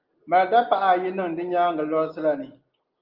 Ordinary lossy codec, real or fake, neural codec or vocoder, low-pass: Opus, 24 kbps; real; none; 5.4 kHz